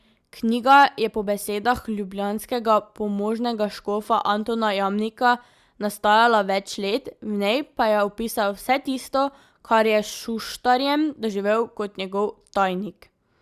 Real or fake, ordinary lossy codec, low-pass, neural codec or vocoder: real; Opus, 64 kbps; 14.4 kHz; none